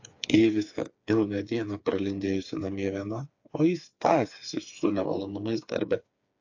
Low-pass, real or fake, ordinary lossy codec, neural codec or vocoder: 7.2 kHz; fake; AAC, 48 kbps; codec, 16 kHz, 4 kbps, FreqCodec, smaller model